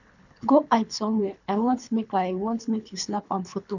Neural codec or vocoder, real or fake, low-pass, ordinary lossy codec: codec, 24 kHz, 3 kbps, HILCodec; fake; 7.2 kHz; none